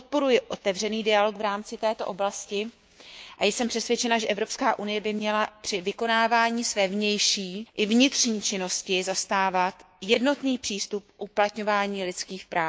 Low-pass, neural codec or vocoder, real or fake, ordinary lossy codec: none; codec, 16 kHz, 6 kbps, DAC; fake; none